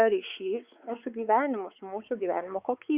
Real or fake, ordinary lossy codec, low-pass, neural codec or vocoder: fake; Opus, 64 kbps; 3.6 kHz; codec, 16 kHz, 4 kbps, X-Codec, WavLM features, trained on Multilingual LibriSpeech